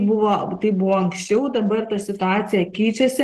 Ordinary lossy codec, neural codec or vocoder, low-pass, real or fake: Opus, 16 kbps; none; 14.4 kHz; real